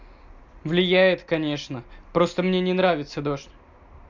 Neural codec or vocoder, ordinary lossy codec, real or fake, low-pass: none; MP3, 64 kbps; real; 7.2 kHz